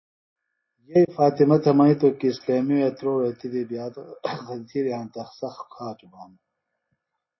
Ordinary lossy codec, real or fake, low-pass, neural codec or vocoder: MP3, 24 kbps; real; 7.2 kHz; none